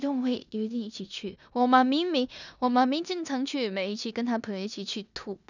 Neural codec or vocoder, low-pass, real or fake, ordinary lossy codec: codec, 16 kHz in and 24 kHz out, 0.9 kbps, LongCat-Audio-Codec, four codebook decoder; 7.2 kHz; fake; none